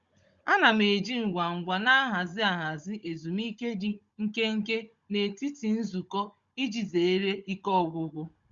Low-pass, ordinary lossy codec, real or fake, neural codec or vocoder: 7.2 kHz; Opus, 64 kbps; fake; codec, 16 kHz, 16 kbps, FunCodec, trained on Chinese and English, 50 frames a second